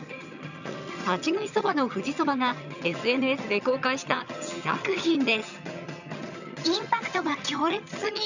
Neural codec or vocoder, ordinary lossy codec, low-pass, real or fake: vocoder, 22.05 kHz, 80 mel bands, HiFi-GAN; none; 7.2 kHz; fake